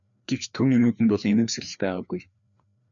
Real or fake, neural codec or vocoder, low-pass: fake; codec, 16 kHz, 2 kbps, FreqCodec, larger model; 7.2 kHz